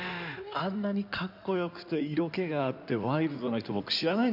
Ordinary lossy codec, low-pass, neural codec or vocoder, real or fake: none; 5.4 kHz; codec, 16 kHz in and 24 kHz out, 2.2 kbps, FireRedTTS-2 codec; fake